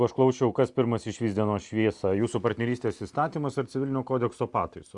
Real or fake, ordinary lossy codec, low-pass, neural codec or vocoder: real; Opus, 64 kbps; 10.8 kHz; none